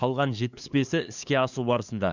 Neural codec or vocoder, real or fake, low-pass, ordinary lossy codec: codec, 16 kHz, 2 kbps, FunCodec, trained on LibriTTS, 25 frames a second; fake; 7.2 kHz; none